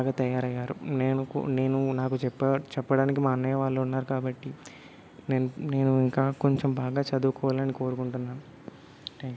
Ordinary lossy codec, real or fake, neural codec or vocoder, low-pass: none; real; none; none